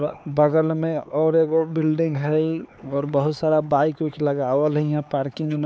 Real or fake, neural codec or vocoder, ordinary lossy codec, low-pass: fake; codec, 16 kHz, 4 kbps, X-Codec, HuBERT features, trained on LibriSpeech; none; none